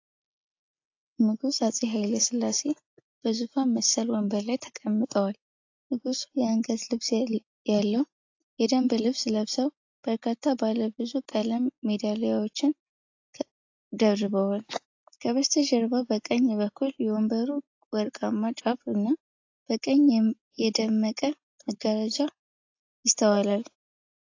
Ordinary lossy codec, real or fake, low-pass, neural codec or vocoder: AAC, 48 kbps; real; 7.2 kHz; none